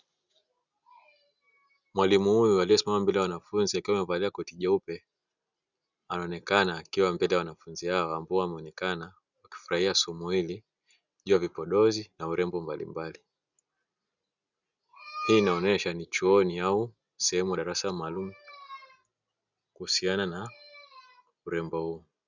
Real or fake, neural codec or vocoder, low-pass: real; none; 7.2 kHz